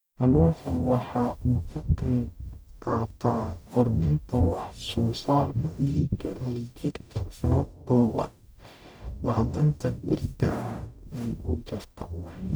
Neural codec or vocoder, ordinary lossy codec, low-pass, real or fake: codec, 44.1 kHz, 0.9 kbps, DAC; none; none; fake